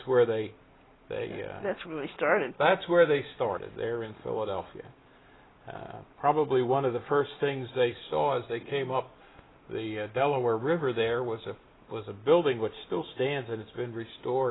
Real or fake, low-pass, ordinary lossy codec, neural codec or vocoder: real; 7.2 kHz; AAC, 16 kbps; none